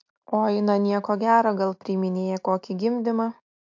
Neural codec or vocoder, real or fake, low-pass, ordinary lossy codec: none; real; 7.2 kHz; MP3, 48 kbps